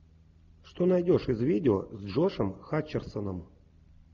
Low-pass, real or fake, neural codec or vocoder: 7.2 kHz; real; none